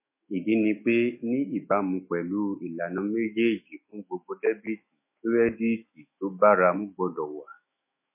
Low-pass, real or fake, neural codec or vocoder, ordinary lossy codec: 3.6 kHz; fake; autoencoder, 48 kHz, 128 numbers a frame, DAC-VAE, trained on Japanese speech; MP3, 24 kbps